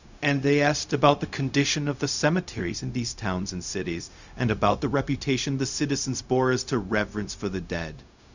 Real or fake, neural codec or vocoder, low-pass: fake; codec, 16 kHz, 0.4 kbps, LongCat-Audio-Codec; 7.2 kHz